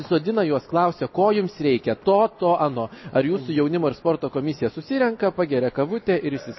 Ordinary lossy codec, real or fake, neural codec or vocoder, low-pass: MP3, 24 kbps; real; none; 7.2 kHz